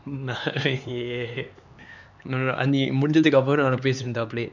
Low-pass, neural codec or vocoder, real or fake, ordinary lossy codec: 7.2 kHz; codec, 16 kHz, 4 kbps, X-Codec, HuBERT features, trained on LibriSpeech; fake; none